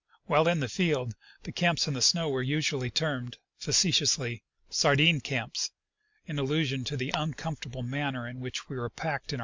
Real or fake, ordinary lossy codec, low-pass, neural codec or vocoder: real; MP3, 64 kbps; 7.2 kHz; none